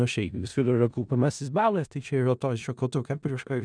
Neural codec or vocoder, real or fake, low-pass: codec, 16 kHz in and 24 kHz out, 0.4 kbps, LongCat-Audio-Codec, four codebook decoder; fake; 9.9 kHz